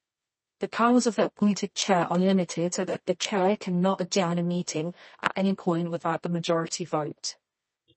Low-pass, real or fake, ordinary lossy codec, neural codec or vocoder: 10.8 kHz; fake; MP3, 32 kbps; codec, 24 kHz, 0.9 kbps, WavTokenizer, medium music audio release